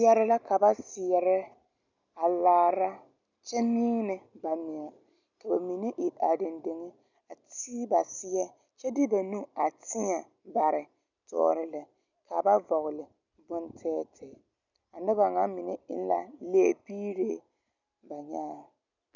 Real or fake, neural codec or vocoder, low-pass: real; none; 7.2 kHz